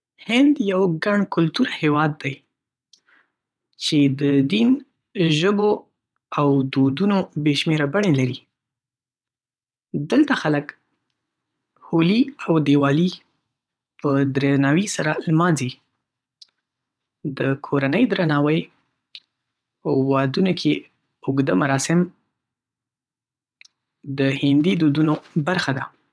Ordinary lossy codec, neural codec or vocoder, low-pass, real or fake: none; vocoder, 22.05 kHz, 80 mel bands, WaveNeXt; none; fake